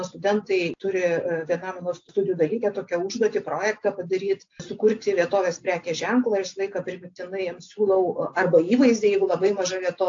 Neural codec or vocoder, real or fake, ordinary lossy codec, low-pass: none; real; AAC, 48 kbps; 7.2 kHz